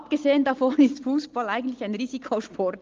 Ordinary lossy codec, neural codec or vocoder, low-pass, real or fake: Opus, 32 kbps; none; 7.2 kHz; real